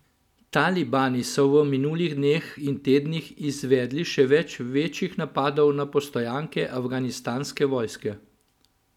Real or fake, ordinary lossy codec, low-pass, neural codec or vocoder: real; none; 19.8 kHz; none